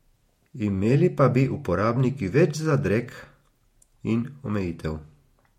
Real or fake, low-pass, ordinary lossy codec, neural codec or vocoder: fake; 19.8 kHz; MP3, 64 kbps; vocoder, 48 kHz, 128 mel bands, Vocos